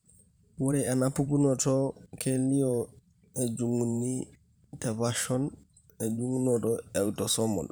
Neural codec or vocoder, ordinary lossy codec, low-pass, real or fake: vocoder, 44.1 kHz, 128 mel bands every 512 samples, BigVGAN v2; none; none; fake